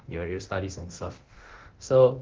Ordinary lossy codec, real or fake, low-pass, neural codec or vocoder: Opus, 16 kbps; fake; 7.2 kHz; codec, 24 kHz, 0.9 kbps, DualCodec